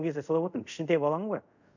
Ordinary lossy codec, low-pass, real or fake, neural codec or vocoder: none; 7.2 kHz; fake; codec, 24 kHz, 0.5 kbps, DualCodec